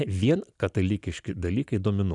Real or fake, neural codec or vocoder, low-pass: fake; vocoder, 44.1 kHz, 128 mel bands every 256 samples, BigVGAN v2; 10.8 kHz